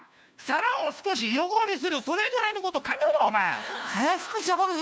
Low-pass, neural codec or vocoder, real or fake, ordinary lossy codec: none; codec, 16 kHz, 1 kbps, FunCodec, trained on LibriTTS, 50 frames a second; fake; none